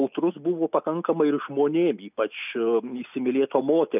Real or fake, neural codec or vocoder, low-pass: real; none; 3.6 kHz